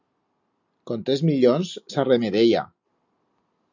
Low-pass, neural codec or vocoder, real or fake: 7.2 kHz; none; real